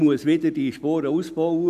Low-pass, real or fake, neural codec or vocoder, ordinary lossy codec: 14.4 kHz; real; none; none